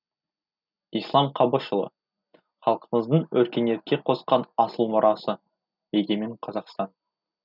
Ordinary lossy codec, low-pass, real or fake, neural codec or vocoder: none; 5.4 kHz; real; none